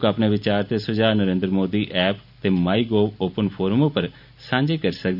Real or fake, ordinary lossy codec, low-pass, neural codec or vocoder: real; none; 5.4 kHz; none